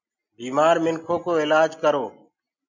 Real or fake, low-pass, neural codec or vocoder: real; 7.2 kHz; none